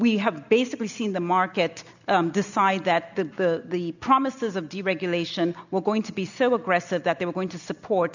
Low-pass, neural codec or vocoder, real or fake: 7.2 kHz; none; real